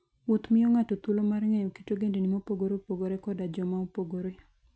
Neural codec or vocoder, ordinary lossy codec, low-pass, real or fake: none; none; none; real